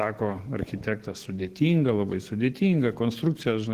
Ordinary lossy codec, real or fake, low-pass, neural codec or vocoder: Opus, 16 kbps; fake; 14.4 kHz; codec, 44.1 kHz, 7.8 kbps, DAC